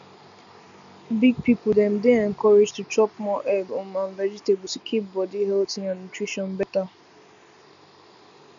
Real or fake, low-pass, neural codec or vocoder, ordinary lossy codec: real; 7.2 kHz; none; none